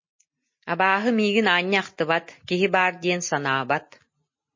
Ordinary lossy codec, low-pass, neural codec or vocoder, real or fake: MP3, 32 kbps; 7.2 kHz; none; real